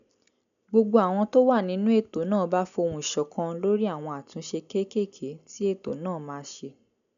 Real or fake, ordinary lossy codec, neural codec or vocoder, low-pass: real; none; none; 7.2 kHz